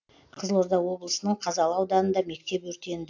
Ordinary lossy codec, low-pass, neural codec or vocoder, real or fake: AAC, 48 kbps; 7.2 kHz; none; real